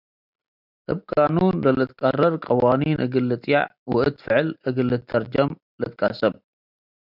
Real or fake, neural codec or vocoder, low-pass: real; none; 5.4 kHz